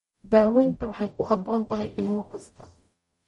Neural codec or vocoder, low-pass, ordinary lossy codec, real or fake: codec, 44.1 kHz, 0.9 kbps, DAC; 19.8 kHz; MP3, 48 kbps; fake